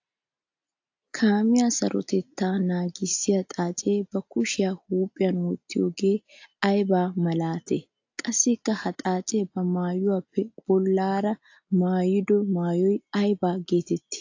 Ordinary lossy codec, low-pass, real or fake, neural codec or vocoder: AAC, 48 kbps; 7.2 kHz; real; none